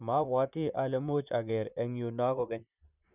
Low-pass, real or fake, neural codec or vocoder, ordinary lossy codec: 3.6 kHz; fake; vocoder, 44.1 kHz, 128 mel bands, Pupu-Vocoder; none